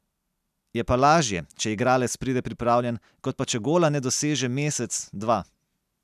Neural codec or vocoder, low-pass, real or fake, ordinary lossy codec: none; 14.4 kHz; real; none